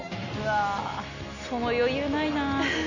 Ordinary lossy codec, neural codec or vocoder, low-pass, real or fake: none; none; 7.2 kHz; real